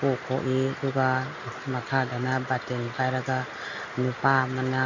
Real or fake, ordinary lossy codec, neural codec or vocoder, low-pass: real; none; none; 7.2 kHz